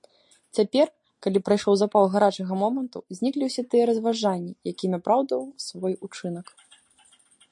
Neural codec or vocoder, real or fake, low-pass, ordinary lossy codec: none; real; 10.8 kHz; MP3, 48 kbps